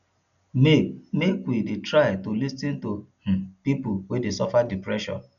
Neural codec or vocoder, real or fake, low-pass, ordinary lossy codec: none; real; 7.2 kHz; none